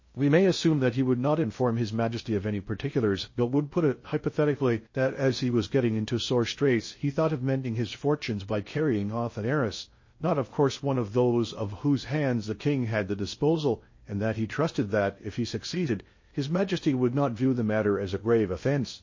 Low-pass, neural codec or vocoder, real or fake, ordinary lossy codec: 7.2 kHz; codec, 16 kHz in and 24 kHz out, 0.6 kbps, FocalCodec, streaming, 2048 codes; fake; MP3, 32 kbps